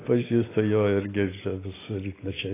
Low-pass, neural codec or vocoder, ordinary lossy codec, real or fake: 3.6 kHz; none; AAC, 16 kbps; real